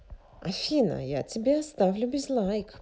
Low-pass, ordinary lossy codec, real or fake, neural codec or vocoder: none; none; real; none